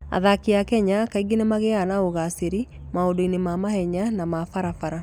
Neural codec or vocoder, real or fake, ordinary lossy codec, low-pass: none; real; none; 19.8 kHz